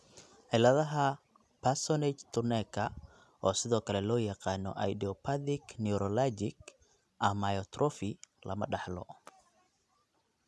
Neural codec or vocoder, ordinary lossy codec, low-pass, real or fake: none; none; none; real